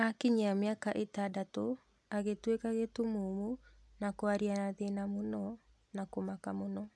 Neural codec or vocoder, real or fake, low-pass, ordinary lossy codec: none; real; none; none